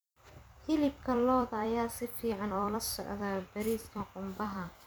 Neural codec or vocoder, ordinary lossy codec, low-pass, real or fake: vocoder, 44.1 kHz, 128 mel bands every 256 samples, BigVGAN v2; none; none; fake